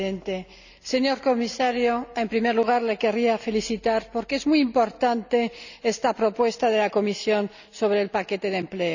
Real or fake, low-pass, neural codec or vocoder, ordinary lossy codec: real; 7.2 kHz; none; none